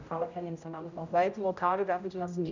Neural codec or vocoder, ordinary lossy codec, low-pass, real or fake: codec, 16 kHz, 0.5 kbps, X-Codec, HuBERT features, trained on general audio; none; 7.2 kHz; fake